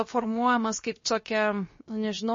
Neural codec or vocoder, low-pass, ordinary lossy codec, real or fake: codec, 16 kHz, 0.7 kbps, FocalCodec; 7.2 kHz; MP3, 32 kbps; fake